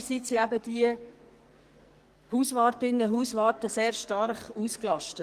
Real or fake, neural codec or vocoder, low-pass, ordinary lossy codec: fake; codec, 44.1 kHz, 2.6 kbps, SNAC; 14.4 kHz; Opus, 24 kbps